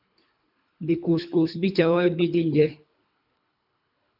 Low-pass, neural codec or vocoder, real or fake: 5.4 kHz; codec, 24 kHz, 3 kbps, HILCodec; fake